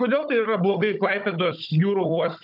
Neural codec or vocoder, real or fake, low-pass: codec, 16 kHz, 16 kbps, FunCodec, trained on Chinese and English, 50 frames a second; fake; 5.4 kHz